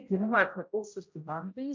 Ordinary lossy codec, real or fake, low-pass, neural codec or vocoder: MP3, 48 kbps; fake; 7.2 kHz; codec, 16 kHz, 0.5 kbps, X-Codec, HuBERT features, trained on general audio